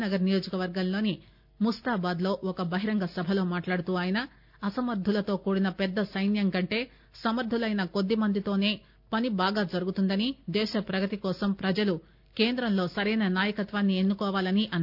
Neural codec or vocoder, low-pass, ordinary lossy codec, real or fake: none; 5.4 kHz; none; real